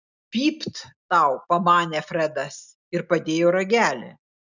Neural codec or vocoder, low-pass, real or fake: none; 7.2 kHz; real